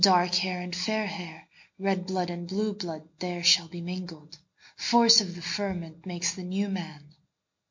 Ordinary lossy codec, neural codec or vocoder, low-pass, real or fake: MP3, 48 kbps; none; 7.2 kHz; real